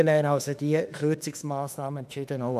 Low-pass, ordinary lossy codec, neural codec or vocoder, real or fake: 14.4 kHz; none; autoencoder, 48 kHz, 32 numbers a frame, DAC-VAE, trained on Japanese speech; fake